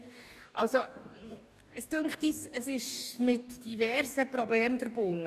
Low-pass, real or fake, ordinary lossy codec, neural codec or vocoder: 14.4 kHz; fake; none; codec, 44.1 kHz, 2.6 kbps, DAC